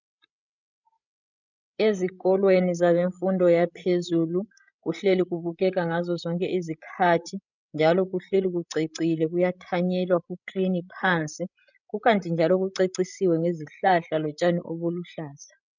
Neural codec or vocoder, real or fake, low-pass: codec, 16 kHz, 16 kbps, FreqCodec, larger model; fake; 7.2 kHz